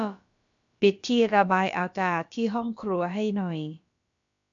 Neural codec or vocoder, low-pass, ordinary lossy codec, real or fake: codec, 16 kHz, about 1 kbps, DyCAST, with the encoder's durations; 7.2 kHz; AAC, 64 kbps; fake